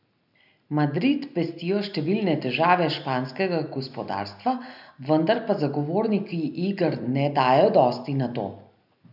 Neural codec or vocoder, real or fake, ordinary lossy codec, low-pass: none; real; none; 5.4 kHz